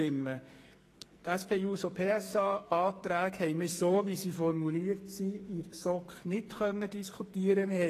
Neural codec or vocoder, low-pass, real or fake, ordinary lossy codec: codec, 44.1 kHz, 2.6 kbps, SNAC; 14.4 kHz; fake; AAC, 48 kbps